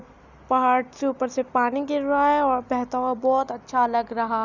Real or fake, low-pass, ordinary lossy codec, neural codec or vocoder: real; 7.2 kHz; none; none